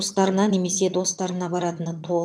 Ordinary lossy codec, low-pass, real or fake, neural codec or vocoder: none; none; fake; vocoder, 22.05 kHz, 80 mel bands, HiFi-GAN